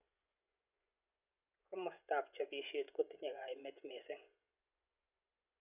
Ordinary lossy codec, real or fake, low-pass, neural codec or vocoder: none; fake; 3.6 kHz; vocoder, 24 kHz, 100 mel bands, Vocos